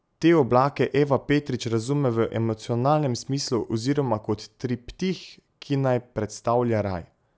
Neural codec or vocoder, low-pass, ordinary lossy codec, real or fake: none; none; none; real